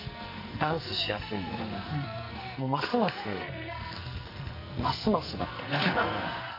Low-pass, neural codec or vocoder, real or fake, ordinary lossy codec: 5.4 kHz; codec, 44.1 kHz, 2.6 kbps, SNAC; fake; none